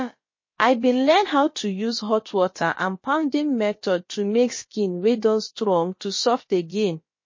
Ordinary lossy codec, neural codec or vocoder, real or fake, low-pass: MP3, 32 kbps; codec, 16 kHz, about 1 kbps, DyCAST, with the encoder's durations; fake; 7.2 kHz